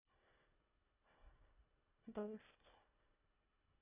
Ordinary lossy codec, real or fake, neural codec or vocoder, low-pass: none; fake; codec, 24 kHz, 1.5 kbps, HILCodec; 3.6 kHz